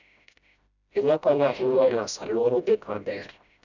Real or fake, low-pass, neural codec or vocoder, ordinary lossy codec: fake; 7.2 kHz; codec, 16 kHz, 0.5 kbps, FreqCodec, smaller model; none